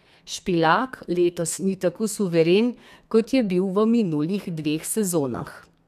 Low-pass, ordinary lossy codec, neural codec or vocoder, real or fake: 14.4 kHz; none; codec, 32 kHz, 1.9 kbps, SNAC; fake